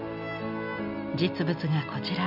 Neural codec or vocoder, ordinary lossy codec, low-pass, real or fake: none; MP3, 48 kbps; 5.4 kHz; real